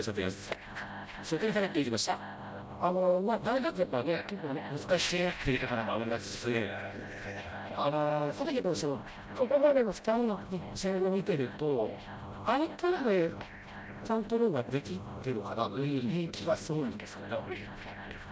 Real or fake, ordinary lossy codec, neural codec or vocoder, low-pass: fake; none; codec, 16 kHz, 0.5 kbps, FreqCodec, smaller model; none